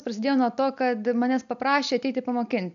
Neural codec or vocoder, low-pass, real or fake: none; 7.2 kHz; real